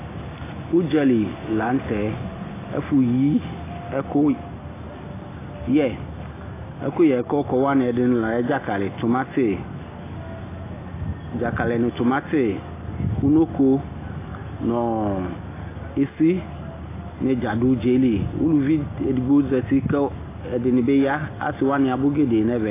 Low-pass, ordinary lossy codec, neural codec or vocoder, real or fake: 3.6 kHz; AAC, 16 kbps; none; real